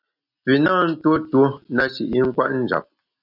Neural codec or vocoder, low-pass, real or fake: none; 5.4 kHz; real